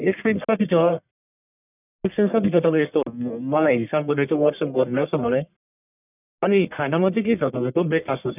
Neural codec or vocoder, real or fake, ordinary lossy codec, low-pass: codec, 44.1 kHz, 1.7 kbps, Pupu-Codec; fake; none; 3.6 kHz